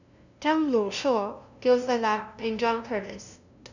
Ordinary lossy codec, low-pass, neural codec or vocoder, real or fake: none; 7.2 kHz; codec, 16 kHz, 0.5 kbps, FunCodec, trained on LibriTTS, 25 frames a second; fake